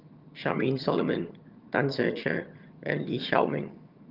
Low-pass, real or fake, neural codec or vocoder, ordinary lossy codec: 5.4 kHz; fake; vocoder, 22.05 kHz, 80 mel bands, HiFi-GAN; Opus, 24 kbps